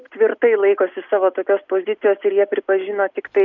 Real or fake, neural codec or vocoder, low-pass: real; none; 7.2 kHz